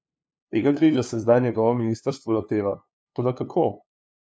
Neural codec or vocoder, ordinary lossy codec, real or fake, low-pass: codec, 16 kHz, 2 kbps, FunCodec, trained on LibriTTS, 25 frames a second; none; fake; none